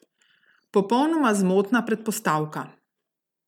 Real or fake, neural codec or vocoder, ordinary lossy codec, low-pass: real; none; none; 19.8 kHz